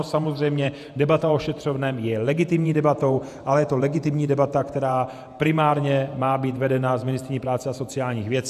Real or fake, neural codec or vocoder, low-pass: fake; vocoder, 48 kHz, 128 mel bands, Vocos; 14.4 kHz